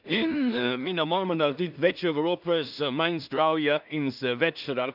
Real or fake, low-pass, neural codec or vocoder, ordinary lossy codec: fake; 5.4 kHz; codec, 16 kHz in and 24 kHz out, 0.4 kbps, LongCat-Audio-Codec, two codebook decoder; none